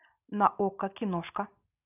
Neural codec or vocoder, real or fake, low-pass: none; real; 3.6 kHz